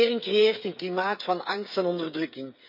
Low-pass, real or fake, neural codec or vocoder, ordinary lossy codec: 5.4 kHz; fake; codec, 16 kHz, 8 kbps, FreqCodec, smaller model; none